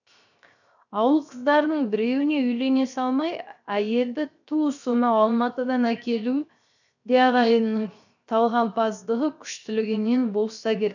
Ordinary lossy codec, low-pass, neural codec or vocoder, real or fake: none; 7.2 kHz; codec, 16 kHz, 0.7 kbps, FocalCodec; fake